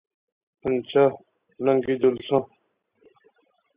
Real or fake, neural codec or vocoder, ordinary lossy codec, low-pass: real; none; Opus, 64 kbps; 3.6 kHz